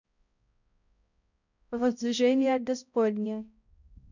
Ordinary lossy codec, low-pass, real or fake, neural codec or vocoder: none; 7.2 kHz; fake; codec, 16 kHz, 0.5 kbps, X-Codec, HuBERT features, trained on balanced general audio